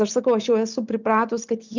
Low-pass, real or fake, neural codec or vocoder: 7.2 kHz; real; none